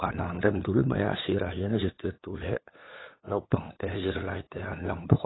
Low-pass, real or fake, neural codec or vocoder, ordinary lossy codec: 7.2 kHz; fake; codec, 16 kHz, 8 kbps, FunCodec, trained on LibriTTS, 25 frames a second; AAC, 16 kbps